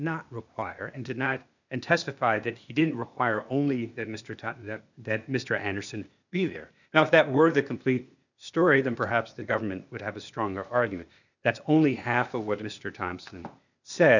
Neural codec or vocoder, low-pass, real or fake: codec, 16 kHz, 0.8 kbps, ZipCodec; 7.2 kHz; fake